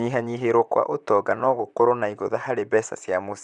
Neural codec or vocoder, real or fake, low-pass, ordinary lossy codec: vocoder, 44.1 kHz, 128 mel bands, Pupu-Vocoder; fake; 10.8 kHz; none